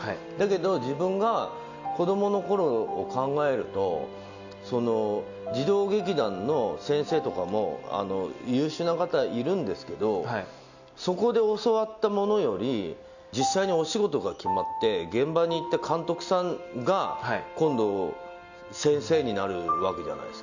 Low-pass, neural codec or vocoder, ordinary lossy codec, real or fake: 7.2 kHz; none; none; real